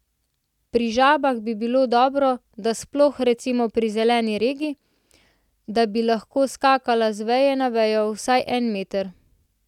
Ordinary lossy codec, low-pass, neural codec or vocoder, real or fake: none; 19.8 kHz; none; real